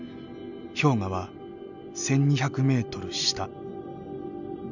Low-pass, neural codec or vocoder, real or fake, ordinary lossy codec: 7.2 kHz; none; real; none